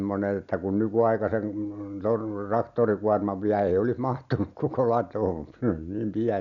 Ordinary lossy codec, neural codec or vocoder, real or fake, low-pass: MP3, 96 kbps; none; real; 7.2 kHz